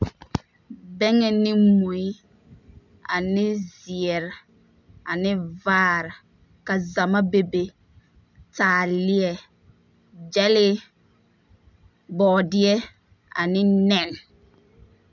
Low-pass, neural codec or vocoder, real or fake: 7.2 kHz; none; real